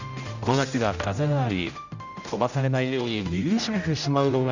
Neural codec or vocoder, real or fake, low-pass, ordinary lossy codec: codec, 16 kHz, 0.5 kbps, X-Codec, HuBERT features, trained on general audio; fake; 7.2 kHz; none